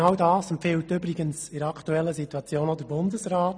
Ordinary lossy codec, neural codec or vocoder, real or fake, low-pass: none; none; real; 9.9 kHz